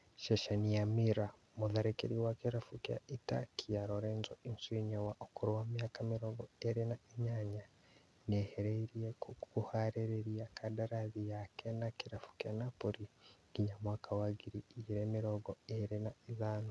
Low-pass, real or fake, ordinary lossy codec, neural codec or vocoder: none; real; none; none